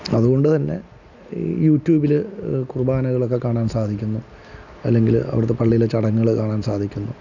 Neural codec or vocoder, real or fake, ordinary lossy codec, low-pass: none; real; none; 7.2 kHz